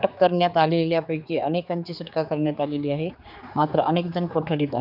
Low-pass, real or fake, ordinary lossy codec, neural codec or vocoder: 5.4 kHz; fake; none; codec, 16 kHz, 4 kbps, X-Codec, HuBERT features, trained on general audio